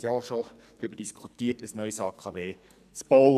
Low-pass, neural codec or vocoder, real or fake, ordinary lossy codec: 14.4 kHz; codec, 44.1 kHz, 2.6 kbps, SNAC; fake; none